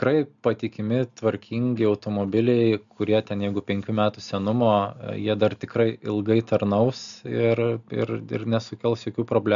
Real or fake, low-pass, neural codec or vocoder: real; 7.2 kHz; none